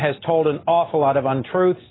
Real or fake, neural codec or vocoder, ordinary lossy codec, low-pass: real; none; AAC, 16 kbps; 7.2 kHz